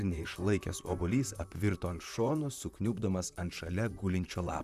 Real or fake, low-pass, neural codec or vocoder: fake; 14.4 kHz; vocoder, 44.1 kHz, 128 mel bands, Pupu-Vocoder